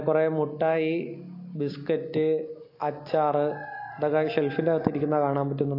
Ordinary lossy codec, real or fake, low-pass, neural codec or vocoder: AAC, 32 kbps; real; 5.4 kHz; none